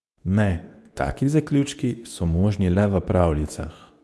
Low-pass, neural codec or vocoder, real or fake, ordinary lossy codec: none; codec, 24 kHz, 0.9 kbps, WavTokenizer, medium speech release version 2; fake; none